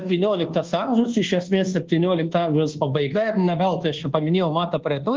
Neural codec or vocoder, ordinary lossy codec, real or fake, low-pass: codec, 24 kHz, 1.2 kbps, DualCodec; Opus, 16 kbps; fake; 7.2 kHz